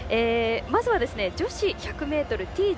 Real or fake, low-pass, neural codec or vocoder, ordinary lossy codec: real; none; none; none